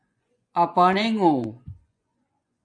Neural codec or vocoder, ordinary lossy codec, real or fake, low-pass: vocoder, 24 kHz, 100 mel bands, Vocos; MP3, 64 kbps; fake; 9.9 kHz